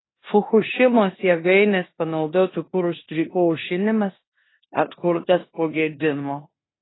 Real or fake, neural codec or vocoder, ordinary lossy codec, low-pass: fake; codec, 16 kHz in and 24 kHz out, 0.9 kbps, LongCat-Audio-Codec, four codebook decoder; AAC, 16 kbps; 7.2 kHz